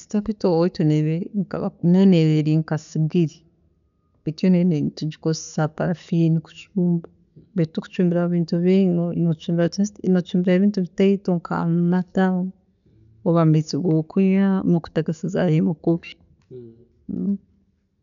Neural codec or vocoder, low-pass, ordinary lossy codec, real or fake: none; 7.2 kHz; none; real